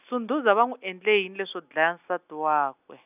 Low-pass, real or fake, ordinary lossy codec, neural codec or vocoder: 3.6 kHz; real; none; none